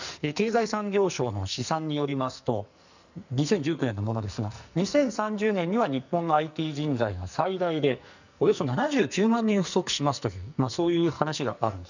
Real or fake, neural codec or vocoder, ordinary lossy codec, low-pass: fake; codec, 44.1 kHz, 2.6 kbps, SNAC; none; 7.2 kHz